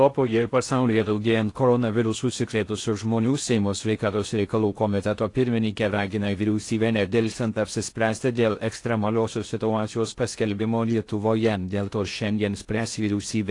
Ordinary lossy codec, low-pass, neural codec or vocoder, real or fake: AAC, 48 kbps; 10.8 kHz; codec, 16 kHz in and 24 kHz out, 0.6 kbps, FocalCodec, streaming, 2048 codes; fake